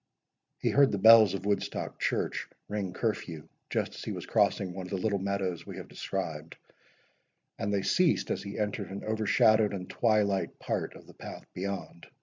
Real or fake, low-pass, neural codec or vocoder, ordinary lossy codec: real; 7.2 kHz; none; MP3, 64 kbps